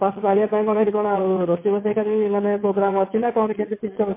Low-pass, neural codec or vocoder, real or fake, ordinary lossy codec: 3.6 kHz; vocoder, 22.05 kHz, 80 mel bands, WaveNeXt; fake; MP3, 24 kbps